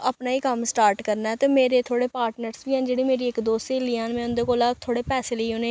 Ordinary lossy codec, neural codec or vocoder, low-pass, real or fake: none; none; none; real